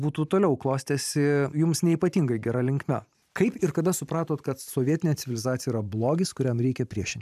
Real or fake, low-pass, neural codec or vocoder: fake; 14.4 kHz; vocoder, 44.1 kHz, 128 mel bands every 512 samples, BigVGAN v2